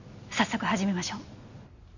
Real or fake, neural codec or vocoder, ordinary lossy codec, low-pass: real; none; none; 7.2 kHz